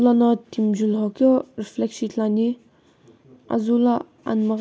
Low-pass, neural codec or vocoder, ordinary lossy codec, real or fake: none; none; none; real